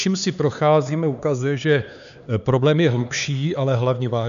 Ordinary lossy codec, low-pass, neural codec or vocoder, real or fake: MP3, 96 kbps; 7.2 kHz; codec, 16 kHz, 4 kbps, X-Codec, HuBERT features, trained on LibriSpeech; fake